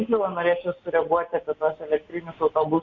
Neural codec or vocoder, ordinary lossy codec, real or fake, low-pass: none; Opus, 64 kbps; real; 7.2 kHz